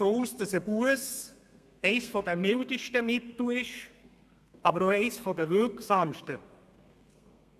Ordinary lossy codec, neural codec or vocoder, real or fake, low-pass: none; codec, 32 kHz, 1.9 kbps, SNAC; fake; 14.4 kHz